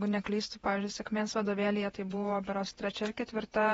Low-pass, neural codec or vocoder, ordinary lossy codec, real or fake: 19.8 kHz; vocoder, 48 kHz, 128 mel bands, Vocos; AAC, 24 kbps; fake